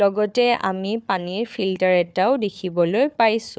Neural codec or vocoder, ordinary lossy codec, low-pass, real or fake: codec, 16 kHz, 4 kbps, FunCodec, trained on Chinese and English, 50 frames a second; none; none; fake